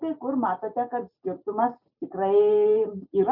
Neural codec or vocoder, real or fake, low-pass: none; real; 5.4 kHz